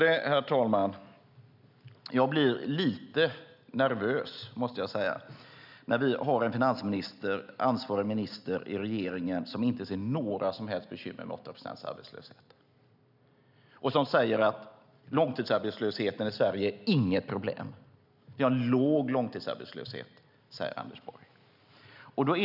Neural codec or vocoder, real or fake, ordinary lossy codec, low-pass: none; real; none; 5.4 kHz